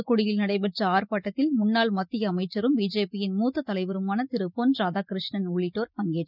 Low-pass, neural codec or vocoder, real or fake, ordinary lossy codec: 5.4 kHz; none; real; none